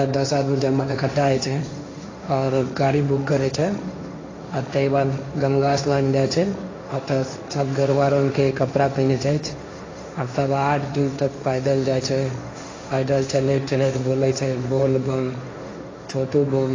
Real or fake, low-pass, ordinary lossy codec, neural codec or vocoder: fake; 7.2 kHz; AAC, 32 kbps; codec, 16 kHz, 1.1 kbps, Voila-Tokenizer